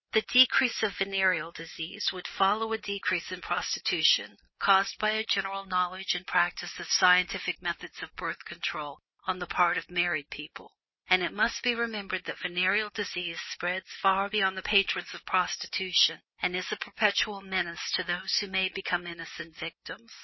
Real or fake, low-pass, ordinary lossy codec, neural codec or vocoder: real; 7.2 kHz; MP3, 24 kbps; none